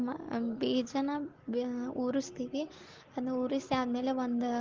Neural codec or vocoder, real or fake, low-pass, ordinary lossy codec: none; real; 7.2 kHz; Opus, 16 kbps